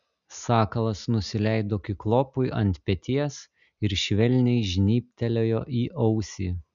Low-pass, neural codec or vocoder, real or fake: 7.2 kHz; none; real